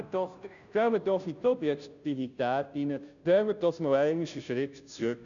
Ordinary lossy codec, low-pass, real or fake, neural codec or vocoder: none; 7.2 kHz; fake; codec, 16 kHz, 0.5 kbps, FunCodec, trained on Chinese and English, 25 frames a second